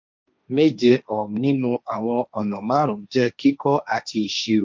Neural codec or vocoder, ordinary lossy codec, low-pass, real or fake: codec, 16 kHz, 1.1 kbps, Voila-Tokenizer; none; none; fake